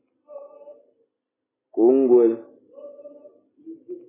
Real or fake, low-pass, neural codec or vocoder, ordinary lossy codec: real; 3.6 kHz; none; MP3, 16 kbps